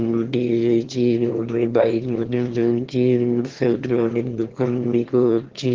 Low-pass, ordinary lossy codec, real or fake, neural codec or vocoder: 7.2 kHz; Opus, 16 kbps; fake; autoencoder, 22.05 kHz, a latent of 192 numbers a frame, VITS, trained on one speaker